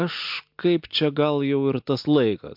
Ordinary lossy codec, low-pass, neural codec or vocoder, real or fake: MP3, 48 kbps; 5.4 kHz; none; real